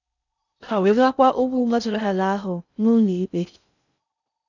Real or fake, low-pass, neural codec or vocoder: fake; 7.2 kHz; codec, 16 kHz in and 24 kHz out, 0.6 kbps, FocalCodec, streaming, 4096 codes